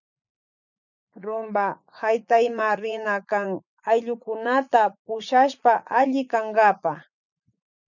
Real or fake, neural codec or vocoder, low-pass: fake; vocoder, 44.1 kHz, 80 mel bands, Vocos; 7.2 kHz